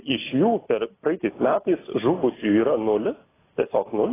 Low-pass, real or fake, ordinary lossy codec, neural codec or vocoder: 3.6 kHz; real; AAC, 16 kbps; none